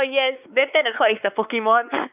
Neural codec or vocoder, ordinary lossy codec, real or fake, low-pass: codec, 16 kHz, 4 kbps, X-Codec, WavLM features, trained on Multilingual LibriSpeech; none; fake; 3.6 kHz